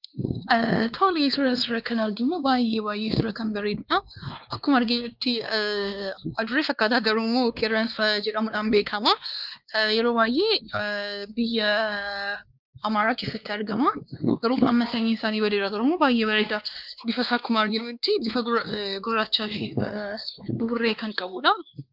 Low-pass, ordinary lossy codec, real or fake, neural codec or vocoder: 5.4 kHz; Opus, 24 kbps; fake; codec, 16 kHz, 2 kbps, X-Codec, WavLM features, trained on Multilingual LibriSpeech